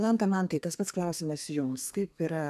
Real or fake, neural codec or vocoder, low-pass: fake; codec, 32 kHz, 1.9 kbps, SNAC; 14.4 kHz